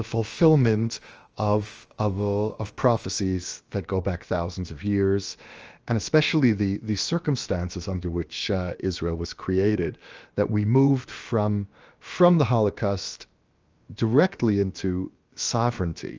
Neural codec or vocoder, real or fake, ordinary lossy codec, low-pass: codec, 16 kHz, about 1 kbps, DyCAST, with the encoder's durations; fake; Opus, 24 kbps; 7.2 kHz